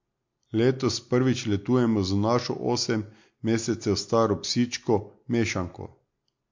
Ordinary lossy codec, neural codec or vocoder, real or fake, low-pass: MP3, 48 kbps; none; real; 7.2 kHz